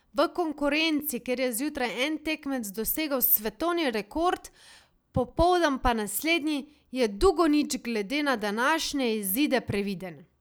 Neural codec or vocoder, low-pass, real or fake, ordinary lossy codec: none; none; real; none